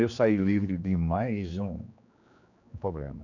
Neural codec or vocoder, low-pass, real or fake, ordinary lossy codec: codec, 16 kHz, 2 kbps, X-Codec, HuBERT features, trained on general audio; 7.2 kHz; fake; none